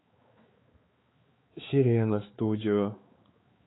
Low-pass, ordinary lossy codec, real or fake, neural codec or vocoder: 7.2 kHz; AAC, 16 kbps; fake; codec, 16 kHz, 4 kbps, X-Codec, HuBERT features, trained on balanced general audio